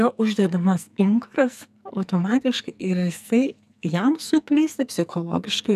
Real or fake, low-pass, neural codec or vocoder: fake; 14.4 kHz; codec, 44.1 kHz, 2.6 kbps, SNAC